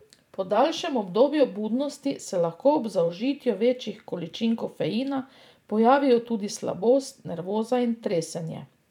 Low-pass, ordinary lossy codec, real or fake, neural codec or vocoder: 19.8 kHz; none; fake; vocoder, 44.1 kHz, 128 mel bands every 512 samples, BigVGAN v2